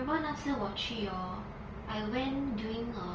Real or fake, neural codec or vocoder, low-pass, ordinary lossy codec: real; none; 7.2 kHz; Opus, 24 kbps